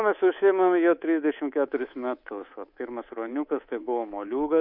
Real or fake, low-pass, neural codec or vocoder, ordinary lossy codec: fake; 5.4 kHz; codec, 24 kHz, 3.1 kbps, DualCodec; MP3, 48 kbps